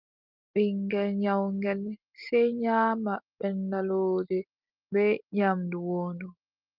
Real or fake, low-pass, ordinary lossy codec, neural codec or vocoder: real; 5.4 kHz; Opus, 32 kbps; none